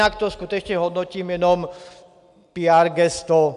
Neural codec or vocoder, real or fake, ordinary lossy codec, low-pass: none; real; AAC, 96 kbps; 10.8 kHz